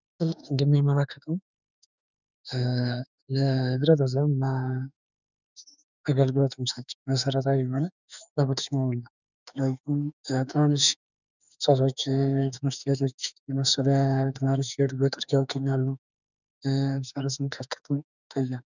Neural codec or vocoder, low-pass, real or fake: autoencoder, 48 kHz, 32 numbers a frame, DAC-VAE, trained on Japanese speech; 7.2 kHz; fake